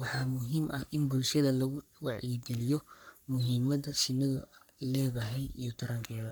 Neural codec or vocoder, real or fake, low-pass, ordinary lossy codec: codec, 44.1 kHz, 3.4 kbps, Pupu-Codec; fake; none; none